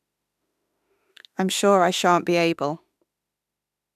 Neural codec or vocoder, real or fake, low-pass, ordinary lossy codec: autoencoder, 48 kHz, 32 numbers a frame, DAC-VAE, trained on Japanese speech; fake; 14.4 kHz; none